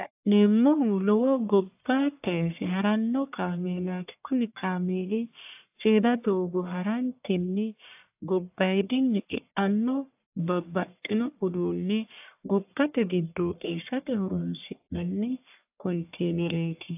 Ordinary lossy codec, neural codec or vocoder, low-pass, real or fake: AAC, 32 kbps; codec, 44.1 kHz, 1.7 kbps, Pupu-Codec; 3.6 kHz; fake